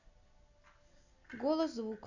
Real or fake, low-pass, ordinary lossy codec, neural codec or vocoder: real; 7.2 kHz; none; none